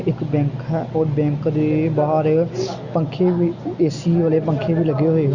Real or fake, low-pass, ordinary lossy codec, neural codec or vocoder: real; 7.2 kHz; Opus, 64 kbps; none